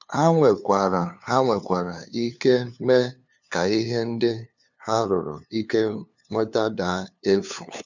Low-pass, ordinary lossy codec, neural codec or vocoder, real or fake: 7.2 kHz; none; codec, 16 kHz, 2 kbps, FunCodec, trained on LibriTTS, 25 frames a second; fake